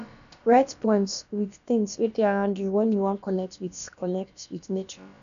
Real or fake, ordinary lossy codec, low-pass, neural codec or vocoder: fake; none; 7.2 kHz; codec, 16 kHz, about 1 kbps, DyCAST, with the encoder's durations